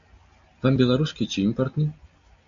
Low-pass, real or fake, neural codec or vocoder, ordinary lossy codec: 7.2 kHz; real; none; AAC, 64 kbps